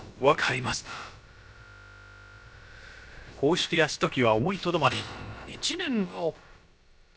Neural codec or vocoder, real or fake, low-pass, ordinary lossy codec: codec, 16 kHz, about 1 kbps, DyCAST, with the encoder's durations; fake; none; none